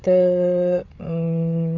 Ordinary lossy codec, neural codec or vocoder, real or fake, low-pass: none; codec, 16 kHz, 8 kbps, FreqCodec, larger model; fake; 7.2 kHz